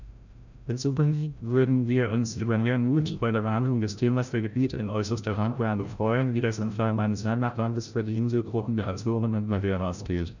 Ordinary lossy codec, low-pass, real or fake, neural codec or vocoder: none; 7.2 kHz; fake; codec, 16 kHz, 0.5 kbps, FreqCodec, larger model